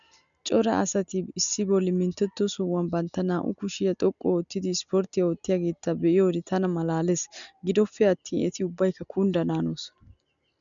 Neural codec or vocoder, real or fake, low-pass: none; real; 7.2 kHz